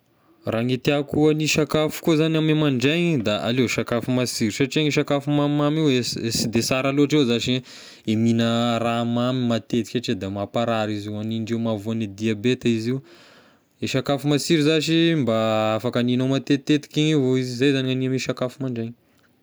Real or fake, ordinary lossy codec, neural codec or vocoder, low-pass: real; none; none; none